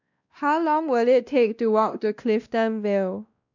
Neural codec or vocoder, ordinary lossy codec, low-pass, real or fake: codec, 16 kHz, 1 kbps, X-Codec, WavLM features, trained on Multilingual LibriSpeech; none; 7.2 kHz; fake